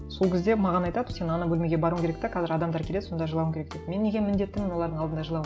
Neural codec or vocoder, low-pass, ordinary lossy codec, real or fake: none; none; none; real